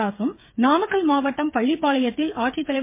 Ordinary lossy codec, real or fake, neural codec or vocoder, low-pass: MP3, 24 kbps; fake; codec, 16 kHz, 8 kbps, FreqCodec, smaller model; 3.6 kHz